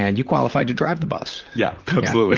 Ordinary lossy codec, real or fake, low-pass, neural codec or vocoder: Opus, 24 kbps; fake; 7.2 kHz; vocoder, 44.1 kHz, 128 mel bands, Pupu-Vocoder